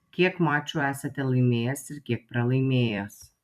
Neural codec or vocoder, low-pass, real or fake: vocoder, 44.1 kHz, 128 mel bands every 256 samples, BigVGAN v2; 14.4 kHz; fake